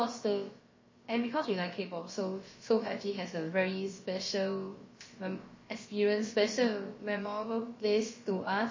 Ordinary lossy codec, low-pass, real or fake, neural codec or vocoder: MP3, 32 kbps; 7.2 kHz; fake; codec, 16 kHz, about 1 kbps, DyCAST, with the encoder's durations